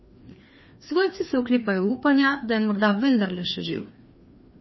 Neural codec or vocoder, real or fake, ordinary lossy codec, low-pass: codec, 16 kHz, 2 kbps, FreqCodec, larger model; fake; MP3, 24 kbps; 7.2 kHz